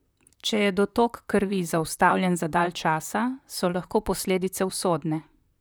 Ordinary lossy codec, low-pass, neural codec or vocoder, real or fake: none; none; vocoder, 44.1 kHz, 128 mel bands, Pupu-Vocoder; fake